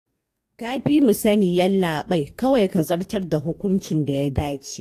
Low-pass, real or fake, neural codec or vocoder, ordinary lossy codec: 14.4 kHz; fake; codec, 44.1 kHz, 2.6 kbps, DAC; AAC, 64 kbps